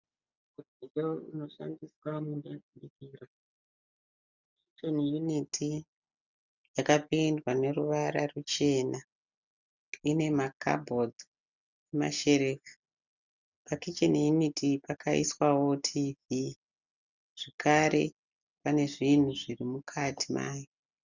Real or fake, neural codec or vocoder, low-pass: real; none; 7.2 kHz